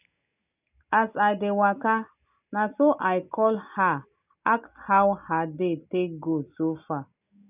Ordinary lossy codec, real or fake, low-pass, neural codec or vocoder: none; real; 3.6 kHz; none